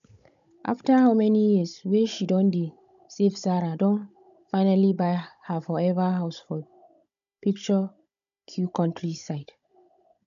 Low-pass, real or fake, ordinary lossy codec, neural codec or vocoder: 7.2 kHz; fake; none; codec, 16 kHz, 16 kbps, FunCodec, trained on Chinese and English, 50 frames a second